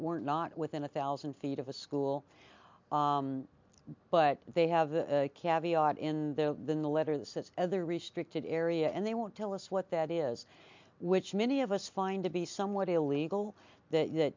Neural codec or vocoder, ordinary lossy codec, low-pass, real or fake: none; MP3, 64 kbps; 7.2 kHz; real